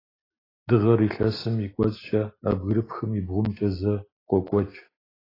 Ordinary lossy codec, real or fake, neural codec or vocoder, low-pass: AAC, 24 kbps; real; none; 5.4 kHz